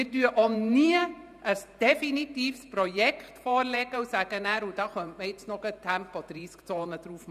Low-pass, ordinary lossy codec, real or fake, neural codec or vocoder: 14.4 kHz; none; fake; vocoder, 48 kHz, 128 mel bands, Vocos